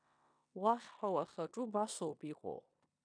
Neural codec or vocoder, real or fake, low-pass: codec, 16 kHz in and 24 kHz out, 0.9 kbps, LongCat-Audio-Codec, four codebook decoder; fake; 9.9 kHz